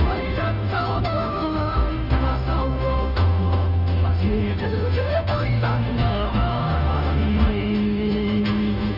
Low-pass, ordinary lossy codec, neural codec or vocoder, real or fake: 5.4 kHz; none; codec, 16 kHz, 0.5 kbps, FunCodec, trained on Chinese and English, 25 frames a second; fake